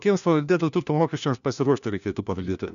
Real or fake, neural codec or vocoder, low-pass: fake; codec, 16 kHz, 1 kbps, FunCodec, trained on LibriTTS, 50 frames a second; 7.2 kHz